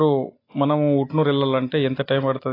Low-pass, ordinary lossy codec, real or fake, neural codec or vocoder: 5.4 kHz; AAC, 24 kbps; real; none